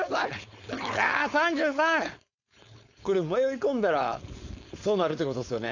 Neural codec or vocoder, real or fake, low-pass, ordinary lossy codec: codec, 16 kHz, 4.8 kbps, FACodec; fake; 7.2 kHz; AAC, 48 kbps